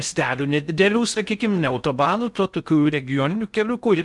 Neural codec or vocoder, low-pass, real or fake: codec, 16 kHz in and 24 kHz out, 0.6 kbps, FocalCodec, streaming, 2048 codes; 10.8 kHz; fake